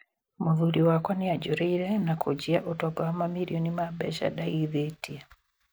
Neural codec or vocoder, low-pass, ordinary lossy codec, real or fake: none; none; none; real